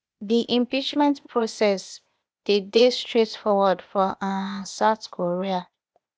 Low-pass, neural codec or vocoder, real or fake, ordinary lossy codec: none; codec, 16 kHz, 0.8 kbps, ZipCodec; fake; none